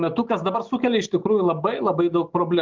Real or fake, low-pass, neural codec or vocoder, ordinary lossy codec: real; 7.2 kHz; none; Opus, 32 kbps